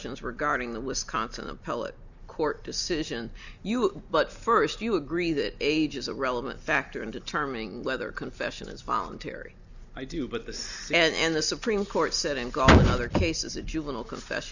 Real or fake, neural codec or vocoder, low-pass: real; none; 7.2 kHz